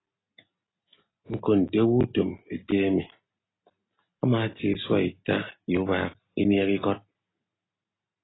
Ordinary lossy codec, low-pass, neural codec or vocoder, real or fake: AAC, 16 kbps; 7.2 kHz; none; real